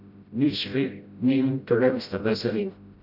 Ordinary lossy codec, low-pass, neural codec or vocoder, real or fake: none; 5.4 kHz; codec, 16 kHz, 0.5 kbps, FreqCodec, smaller model; fake